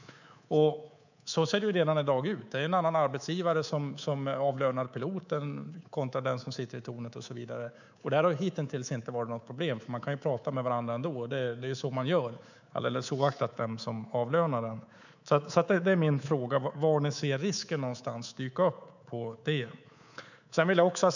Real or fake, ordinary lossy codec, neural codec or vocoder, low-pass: fake; none; codec, 24 kHz, 3.1 kbps, DualCodec; 7.2 kHz